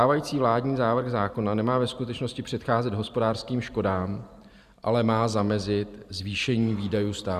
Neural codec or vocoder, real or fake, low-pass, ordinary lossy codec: vocoder, 44.1 kHz, 128 mel bands every 512 samples, BigVGAN v2; fake; 14.4 kHz; Opus, 64 kbps